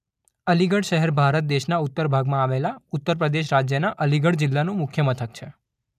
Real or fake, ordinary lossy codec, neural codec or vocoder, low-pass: real; none; none; 14.4 kHz